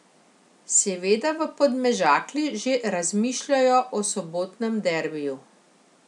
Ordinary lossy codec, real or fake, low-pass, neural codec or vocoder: none; real; 10.8 kHz; none